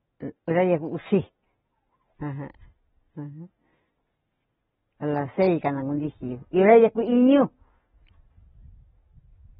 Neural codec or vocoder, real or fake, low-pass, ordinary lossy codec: none; real; 7.2 kHz; AAC, 16 kbps